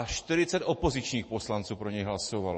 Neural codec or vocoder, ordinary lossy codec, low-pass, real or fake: none; MP3, 32 kbps; 10.8 kHz; real